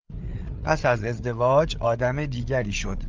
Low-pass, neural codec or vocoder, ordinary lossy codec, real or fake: 7.2 kHz; codec, 16 kHz, 8 kbps, FreqCodec, larger model; Opus, 24 kbps; fake